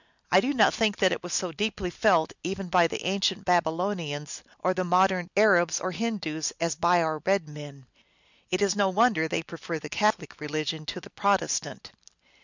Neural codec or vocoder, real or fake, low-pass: none; real; 7.2 kHz